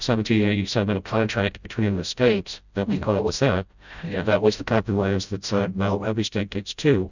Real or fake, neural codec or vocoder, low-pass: fake; codec, 16 kHz, 0.5 kbps, FreqCodec, smaller model; 7.2 kHz